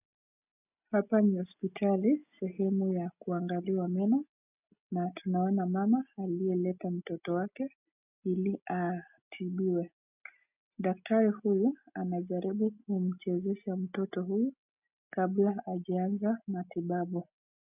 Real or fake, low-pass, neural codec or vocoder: real; 3.6 kHz; none